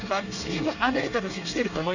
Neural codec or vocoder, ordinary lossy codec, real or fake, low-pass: codec, 24 kHz, 1 kbps, SNAC; none; fake; 7.2 kHz